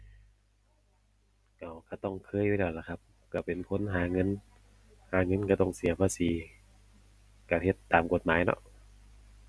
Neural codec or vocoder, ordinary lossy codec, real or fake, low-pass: none; none; real; none